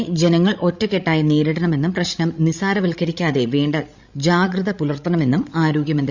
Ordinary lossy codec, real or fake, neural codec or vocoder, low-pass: Opus, 64 kbps; fake; codec, 16 kHz, 16 kbps, FreqCodec, larger model; 7.2 kHz